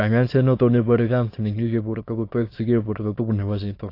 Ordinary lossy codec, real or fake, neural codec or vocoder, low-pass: AAC, 32 kbps; fake; autoencoder, 22.05 kHz, a latent of 192 numbers a frame, VITS, trained on many speakers; 5.4 kHz